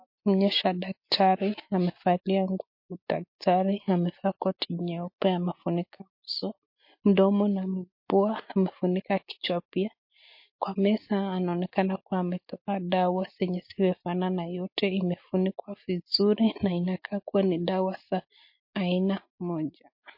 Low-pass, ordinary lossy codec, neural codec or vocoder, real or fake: 5.4 kHz; MP3, 32 kbps; none; real